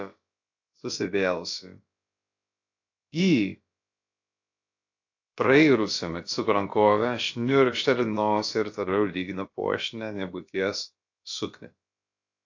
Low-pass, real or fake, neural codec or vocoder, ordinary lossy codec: 7.2 kHz; fake; codec, 16 kHz, about 1 kbps, DyCAST, with the encoder's durations; AAC, 48 kbps